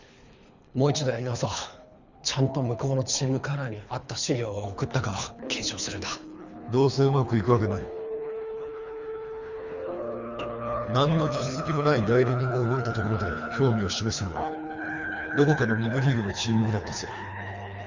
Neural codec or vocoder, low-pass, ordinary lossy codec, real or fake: codec, 24 kHz, 3 kbps, HILCodec; 7.2 kHz; Opus, 64 kbps; fake